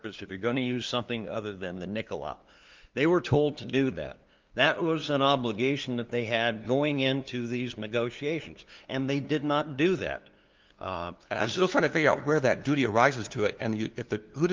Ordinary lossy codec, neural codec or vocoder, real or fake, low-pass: Opus, 32 kbps; codec, 16 kHz, 2 kbps, FunCodec, trained on LibriTTS, 25 frames a second; fake; 7.2 kHz